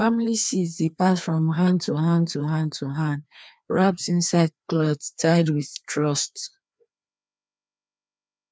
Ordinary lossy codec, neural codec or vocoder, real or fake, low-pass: none; codec, 16 kHz, 2 kbps, FreqCodec, larger model; fake; none